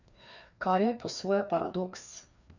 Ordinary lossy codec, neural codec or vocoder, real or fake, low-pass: none; codec, 16 kHz, 2 kbps, FreqCodec, larger model; fake; 7.2 kHz